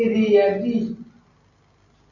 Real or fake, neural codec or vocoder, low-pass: real; none; 7.2 kHz